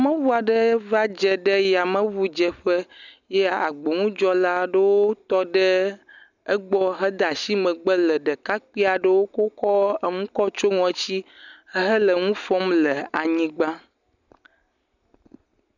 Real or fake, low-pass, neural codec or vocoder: real; 7.2 kHz; none